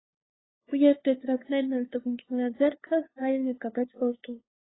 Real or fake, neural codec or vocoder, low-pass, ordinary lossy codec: fake; codec, 16 kHz, 2 kbps, FunCodec, trained on LibriTTS, 25 frames a second; 7.2 kHz; AAC, 16 kbps